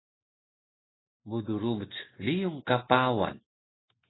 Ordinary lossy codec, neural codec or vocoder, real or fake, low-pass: AAC, 16 kbps; none; real; 7.2 kHz